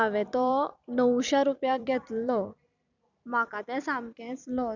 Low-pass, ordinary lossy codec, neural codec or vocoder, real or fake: 7.2 kHz; none; vocoder, 44.1 kHz, 128 mel bands every 256 samples, BigVGAN v2; fake